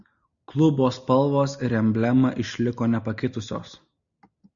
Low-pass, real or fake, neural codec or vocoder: 7.2 kHz; real; none